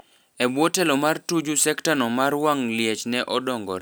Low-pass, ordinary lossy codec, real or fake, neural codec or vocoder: none; none; real; none